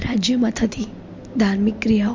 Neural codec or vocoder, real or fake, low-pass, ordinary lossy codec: none; real; 7.2 kHz; MP3, 48 kbps